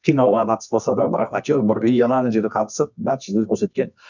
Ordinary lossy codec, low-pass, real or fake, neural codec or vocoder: none; 7.2 kHz; fake; codec, 24 kHz, 0.9 kbps, WavTokenizer, medium music audio release